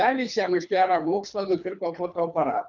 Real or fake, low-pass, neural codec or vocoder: fake; 7.2 kHz; codec, 24 kHz, 3 kbps, HILCodec